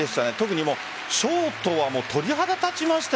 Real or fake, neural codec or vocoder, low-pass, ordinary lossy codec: real; none; none; none